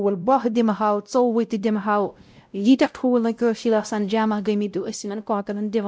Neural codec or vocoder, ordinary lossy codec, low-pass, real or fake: codec, 16 kHz, 0.5 kbps, X-Codec, WavLM features, trained on Multilingual LibriSpeech; none; none; fake